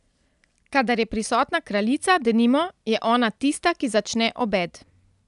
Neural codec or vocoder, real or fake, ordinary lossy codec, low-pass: none; real; none; 10.8 kHz